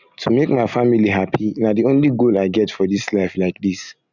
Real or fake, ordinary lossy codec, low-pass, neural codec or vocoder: real; none; 7.2 kHz; none